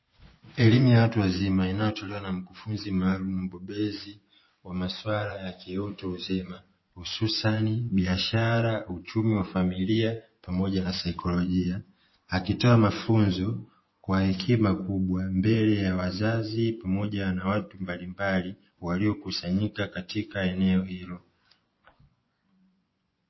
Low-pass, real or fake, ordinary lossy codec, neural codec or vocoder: 7.2 kHz; fake; MP3, 24 kbps; vocoder, 24 kHz, 100 mel bands, Vocos